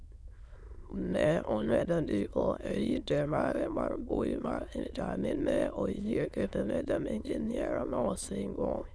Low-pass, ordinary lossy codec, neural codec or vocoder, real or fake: none; none; autoencoder, 22.05 kHz, a latent of 192 numbers a frame, VITS, trained on many speakers; fake